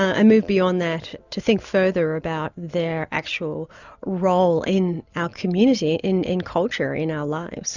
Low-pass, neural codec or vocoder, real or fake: 7.2 kHz; none; real